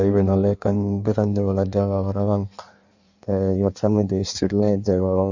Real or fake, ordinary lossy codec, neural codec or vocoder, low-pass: fake; none; codec, 16 kHz in and 24 kHz out, 1.1 kbps, FireRedTTS-2 codec; 7.2 kHz